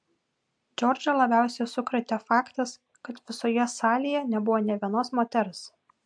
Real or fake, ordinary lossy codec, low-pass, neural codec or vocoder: real; MP3, 64 kbps; 9.9 kHz; none